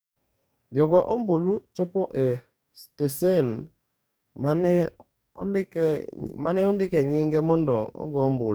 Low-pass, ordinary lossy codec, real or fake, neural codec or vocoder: none; none; fake; codec, 44.1 kHz, 2.6 kbps, DAC